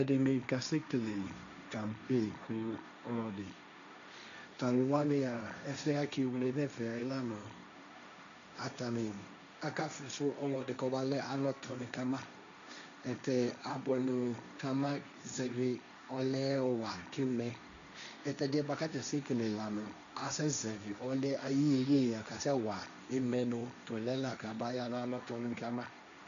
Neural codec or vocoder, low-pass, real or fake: codec, 16 kHz, 1.1 kbps, Voila-Tokenizer; 7.2 kHz; fake